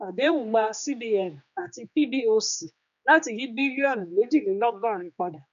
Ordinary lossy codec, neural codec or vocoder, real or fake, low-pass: none; codec, 16 kHz, 2 kbps, X-Codec, HuBERT features, trained on general audio; fake; 7.2 kHz